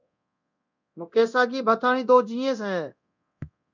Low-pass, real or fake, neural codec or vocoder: 7.2 kHz; fake; codec, 24 kHz, 0.9 kbps, DualCodec